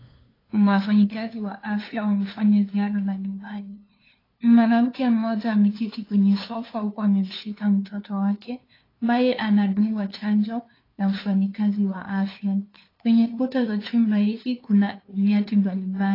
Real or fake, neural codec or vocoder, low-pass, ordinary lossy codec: fake; codec, 16 kHz, 2 kbps, FunCodec, trained on LibriTTS, 25 frames a second; 5.4 kHz; AAC, 24 kbps